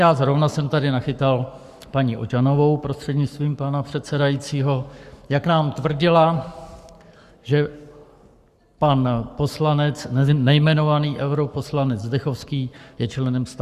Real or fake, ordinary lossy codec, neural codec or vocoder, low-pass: fake; Opus, 64 kbps; codec, 44.1 kHz, 7.8 kbps, Pupu-Codec; 14.4 kHz